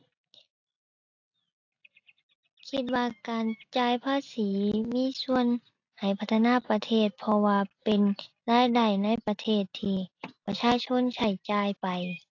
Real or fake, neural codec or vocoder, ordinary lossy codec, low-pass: real; none; AAC, 48 kbps; 7.2 kHz